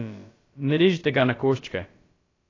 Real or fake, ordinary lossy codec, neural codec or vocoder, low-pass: fake; AAC, 32 kbps; codec, 16 kHz, about 1 kbps, DyCAST, with the encoder's durations; 7.2 kHz